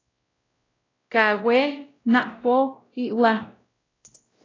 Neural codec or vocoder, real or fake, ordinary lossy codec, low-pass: codec, 16 kHz, 0.5 kbps, X-Codec, WavLM features, trained on Multilingual LibriSpeech; fake; AAC, 32 kbps; 7.2 kHz